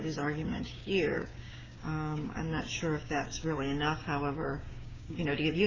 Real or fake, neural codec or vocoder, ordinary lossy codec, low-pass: fake; codec, 16 kHz, 6 kbps, DAC; MP3, 64 kbps; 7.2 kHz